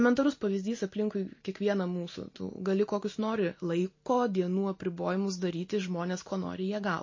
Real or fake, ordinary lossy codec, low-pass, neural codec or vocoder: real; MP3, 32 kbps; 7.2 kHz; none